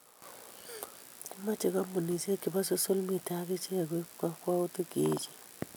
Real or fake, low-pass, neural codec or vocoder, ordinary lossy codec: real; none; none; none